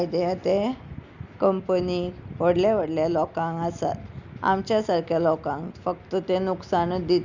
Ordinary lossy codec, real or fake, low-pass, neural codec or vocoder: Opus, 64 kbps; real; 7.2 kHz; none